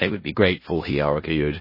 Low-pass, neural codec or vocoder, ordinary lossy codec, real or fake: 5.4 kHz; codec, 16 kHz in and 24 kHz out, 0.4 kbps, LongCat-Audio-Codec, four codebook decoder; MP3, 24 kbps; fake